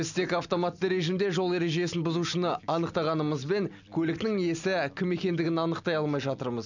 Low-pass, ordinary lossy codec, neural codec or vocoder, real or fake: 7.2 kHz; none; none; real